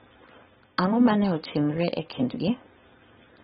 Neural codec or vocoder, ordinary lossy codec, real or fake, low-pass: codec, 16 kHz, 4.8 kbps, FACodec; AAC, 16 kbps; fake; 7.2 kHz